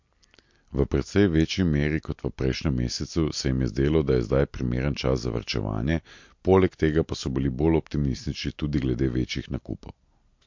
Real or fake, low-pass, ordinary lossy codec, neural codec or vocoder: real; 7.2 kHz; MP3, 48 kbps; none